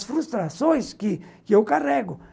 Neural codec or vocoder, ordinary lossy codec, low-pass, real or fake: none; none; none; real